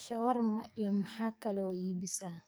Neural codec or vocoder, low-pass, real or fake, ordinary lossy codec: codec, 44.1 kHz, 2.6 kbps, SNAC; none; fake; none